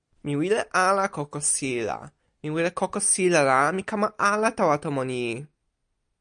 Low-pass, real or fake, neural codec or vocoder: 9.9 kHz; real; none